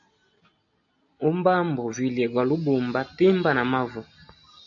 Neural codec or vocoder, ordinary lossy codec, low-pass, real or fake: none; AAC, 48 kbps; 7.2 kHz; real